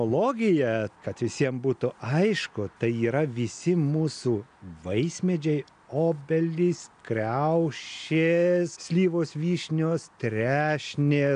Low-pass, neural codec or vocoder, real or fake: 9.9 kHz; none; real